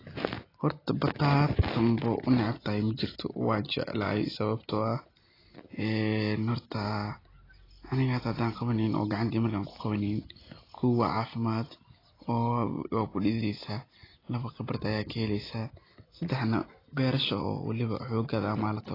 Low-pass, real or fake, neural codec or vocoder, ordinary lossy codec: 5.4 kHz; real; none; AAC, 24 kbps